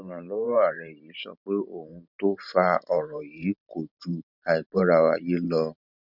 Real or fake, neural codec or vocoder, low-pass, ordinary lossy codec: fake; vocoder, 44.1 kHz, 128 mel bands every 512 samples, BigVGAN v2; 5.4 kHz; none